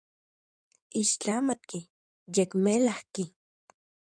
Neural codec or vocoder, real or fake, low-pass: codec, 16 kHz in and 24 kHz out, 2.2 kbps, FireRedTTS-2 codec; fake; 9.9 kHz